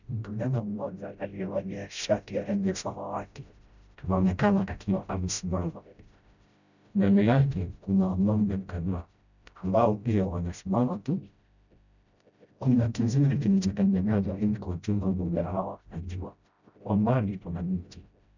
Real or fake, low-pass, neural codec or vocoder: fake; 7.2 kHz; codec, 16 kHz, 0.5 kbps, FreqCodec, smaller model